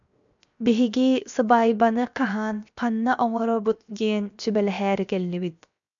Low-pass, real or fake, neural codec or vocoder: 7.2 kHz; fake; codec, 16 kHz, 0.7 kbps, FocalCodec